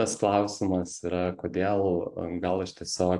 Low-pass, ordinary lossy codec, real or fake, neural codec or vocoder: 10.8 kHz; AAC, 64 kbps; fake; vocoder, 44.1 kHz, 128 mel bands every 512 samples, BigVGAN v2